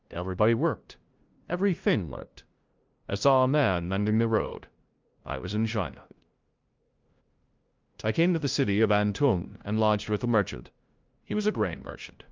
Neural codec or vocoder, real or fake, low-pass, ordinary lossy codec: codec, 16 kHz, 0.5 kbps, FunCodec, trained on LibriTTS, 25 frames a second; fake; 7.2 kHz; Opus, 24 kbps